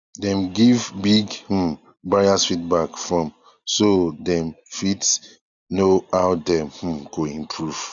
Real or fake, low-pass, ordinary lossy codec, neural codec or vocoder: real; 7.2 kHz; none; none